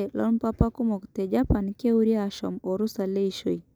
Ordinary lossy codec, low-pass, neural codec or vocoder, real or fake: none; none; none; real